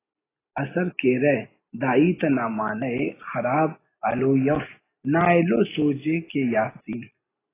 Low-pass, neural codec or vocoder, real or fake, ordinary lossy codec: 3.6 kHz; none; real; AAC, 16 kbps